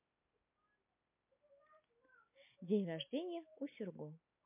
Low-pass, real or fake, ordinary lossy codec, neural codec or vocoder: 3.6 kHz; real; MP3, 24 kbps; none